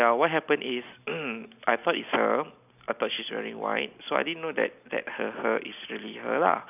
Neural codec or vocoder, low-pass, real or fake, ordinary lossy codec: none; 3.6 kHz; real; none